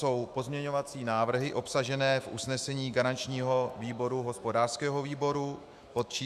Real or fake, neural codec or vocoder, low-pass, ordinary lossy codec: fake; autoencoder, 48 kHz, 128 numbers a frame, DAC-VAE, trained on Japanese speech; 14.4 kHz; Opus, 64 kbps